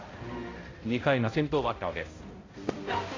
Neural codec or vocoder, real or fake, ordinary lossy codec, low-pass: codec, 16 kHz, 0.5 kbps, X-Codec, HuBERT features, trained on balanced general audio; fake; AAC, 32 kbps; 7.2 kHz